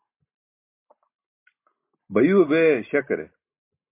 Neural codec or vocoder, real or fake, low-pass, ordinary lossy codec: none; real; 3.6 kHz; MP3, 24 kbps